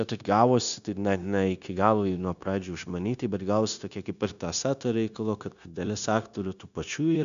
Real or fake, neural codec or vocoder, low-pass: fake; codec, 16 kHz, 0.9 kbps, LongCat-Audio-Codec; 7.2 kHz